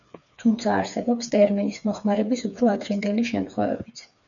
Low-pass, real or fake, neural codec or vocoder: 7.2 kHz; fake; codec, 16 kHz, 4 kbps, FreqCodec, smaller model